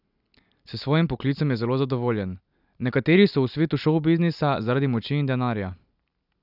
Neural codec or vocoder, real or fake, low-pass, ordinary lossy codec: none; real; 5.4 kHz; none